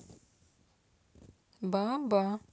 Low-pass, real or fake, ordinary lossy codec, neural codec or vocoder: none; real; none; none